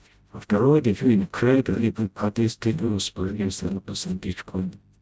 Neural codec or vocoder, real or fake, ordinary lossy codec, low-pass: codec, 16 kHz, 0.5 kbps, FreqCodec, smaller model; fake; none; none